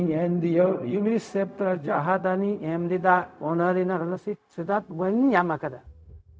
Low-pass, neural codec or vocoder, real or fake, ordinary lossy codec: none; codec, 16 kHz, 0.4 kbps, LongCat-Audio-Codec; fake; none